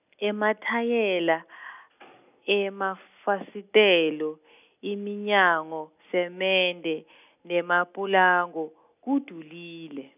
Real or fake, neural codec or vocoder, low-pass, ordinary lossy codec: real; none; 3.6 kHz; none